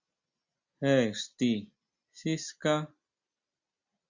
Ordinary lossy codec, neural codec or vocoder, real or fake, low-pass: Opus, 64 kbps; none; real; 7.2 kHz